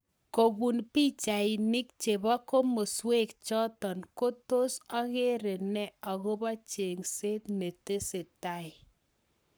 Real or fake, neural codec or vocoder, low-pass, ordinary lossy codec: fake; codec, 44.1 kHz, 7.8 kbps, Pupu-Codec; none; none